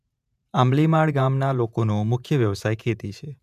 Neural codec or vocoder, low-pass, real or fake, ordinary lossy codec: vocoder, 48 kHz, 128 mel bands, Vocos; 14.4 kHz; fake; none